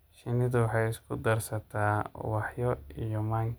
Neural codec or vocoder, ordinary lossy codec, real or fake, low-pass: none; none; real; none